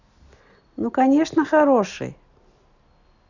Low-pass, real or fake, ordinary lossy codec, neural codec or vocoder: 7.2 kHz; real; none; none